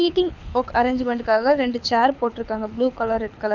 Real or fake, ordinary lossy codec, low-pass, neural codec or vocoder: fake; none; 7.2 kHz; codec, 24 kHz, 6 kbps, HILCodec